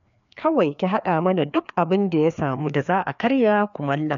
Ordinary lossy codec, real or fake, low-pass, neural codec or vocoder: none; fake; 7.2 kHz; codec, 16 kHz, 2 kbps, FreqCodec, larger model